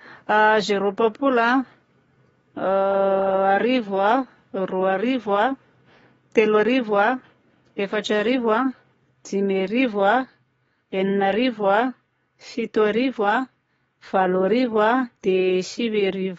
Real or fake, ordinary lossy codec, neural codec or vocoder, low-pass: fake; AAC, 24 kbps; codec, 44.1 kHz, 7.8 kbps, DAC; 19.8 kHz